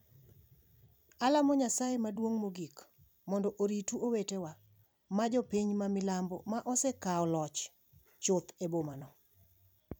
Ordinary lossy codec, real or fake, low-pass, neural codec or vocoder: none; fake; none; vocoder, 44.1 kHz, 128 mel bands every 256 samples, BigVGAN v2